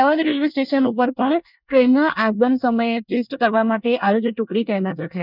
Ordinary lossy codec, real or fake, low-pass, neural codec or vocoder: none; fake; 5.4 kHz; codec, 24 kHz, 1 kbps, SNAC